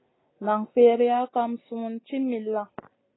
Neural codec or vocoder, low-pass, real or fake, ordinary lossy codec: none; 7.2 kHz; real; AAC, 16 kbps